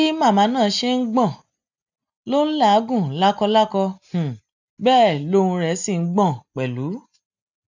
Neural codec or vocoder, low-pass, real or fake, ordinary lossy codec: none; 7.2 kHz; real; none